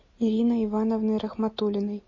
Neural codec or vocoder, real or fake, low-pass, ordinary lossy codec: none; real; 7.2 kHz; MP3, 32 kbps